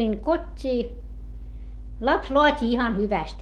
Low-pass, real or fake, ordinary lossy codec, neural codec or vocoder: 19.8 kHz; fake; Opus, 16 kbps; autoencoder, 48 kHz, 128 numbers a frame, DAC-VAE, trained on Japanese speech